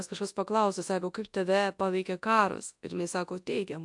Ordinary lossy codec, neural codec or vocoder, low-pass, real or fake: AAC, 64 kbps; codec, 24 kHz, 0.9 kbps, WavTokenizer, large speech release; 10.8 kHz; fake